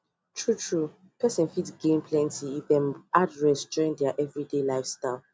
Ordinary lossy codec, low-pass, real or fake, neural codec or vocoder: none; none; real; none